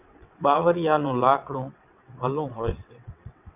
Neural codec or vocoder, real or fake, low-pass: vocoder, 22.05 kHz, 80 mel bands, WaveNeXt; fake; 3.6 kHz